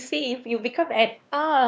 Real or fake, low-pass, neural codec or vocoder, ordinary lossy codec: fake; none; codec, 16 kHz, 2 kbps, X-Codec, WavLM features, trained on Multilingual LibriSpeech; none